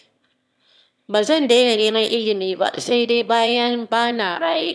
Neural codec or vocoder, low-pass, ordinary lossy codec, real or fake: autoencoder, 22.05 kHz, a latent of 192 numbers a frame, VITS, trained on one speaker; none; none; fake